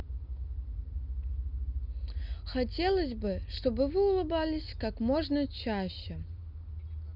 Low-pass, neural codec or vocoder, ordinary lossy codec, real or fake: 5.4 kHz; none; none; real